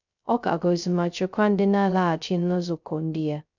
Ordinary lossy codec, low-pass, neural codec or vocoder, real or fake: none; 7.2 kHz; codec, 16 kHz, 0.2 kbps, FocalCodec; fake